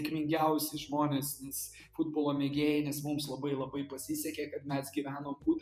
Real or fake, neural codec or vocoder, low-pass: fake; vocoder, 48 kHz, 128 mel bands, Vocos; 14.4 kHz